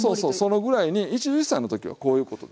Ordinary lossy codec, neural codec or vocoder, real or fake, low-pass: none; none; real; none